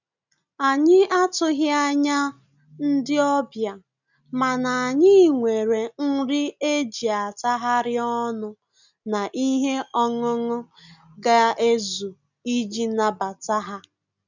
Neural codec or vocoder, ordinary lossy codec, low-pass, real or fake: none; none; 7.2 kHz; real